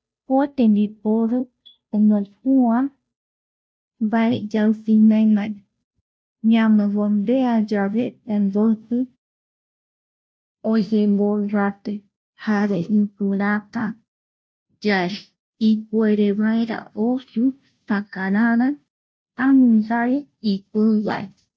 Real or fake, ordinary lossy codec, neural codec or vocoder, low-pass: fake; none; codec, 16 kHz, 0.5 kbps, FunCodec, trained on Chinese and English, 25 frames a second; none